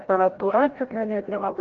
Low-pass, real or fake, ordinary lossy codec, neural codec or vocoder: 7.2 kHz; fake; Opus, 16 kbps; codec, 16 kHz, 0.5 kbps, FreqCodec, larger model